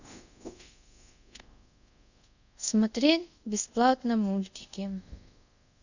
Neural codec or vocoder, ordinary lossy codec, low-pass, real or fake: codec, 24 kHz, 0.5 kbps, DualCodec; none; 7.2 kHz; fake